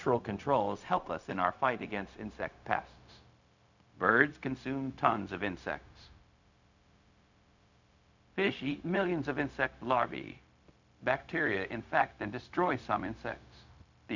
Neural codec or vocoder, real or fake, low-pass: codec, 16 kHz, 0.4 kbps, LongCat-Audio-Codec; fake; 7.2 kHz